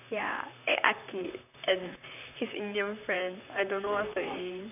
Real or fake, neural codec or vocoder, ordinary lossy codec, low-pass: fake; vocoder, 44.1 kHz, 128 mel bands, Pupu-Vocoder; none; 3.6 kHz